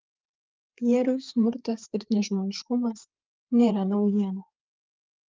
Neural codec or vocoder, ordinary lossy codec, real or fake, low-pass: codec, 16 kHz, 4 kbps, FreqCodec, larger model; Opus, 32 kbps; fake; 7.2 kHz